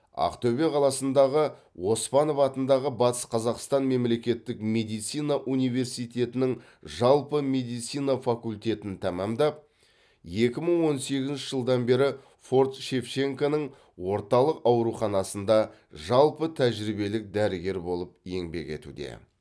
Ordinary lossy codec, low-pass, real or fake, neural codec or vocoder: none; none; real; none